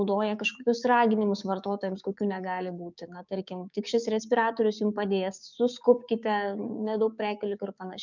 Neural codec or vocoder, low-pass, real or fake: codec, 16 kHz, 6 kbps, DAC; 7.2 kHz; fake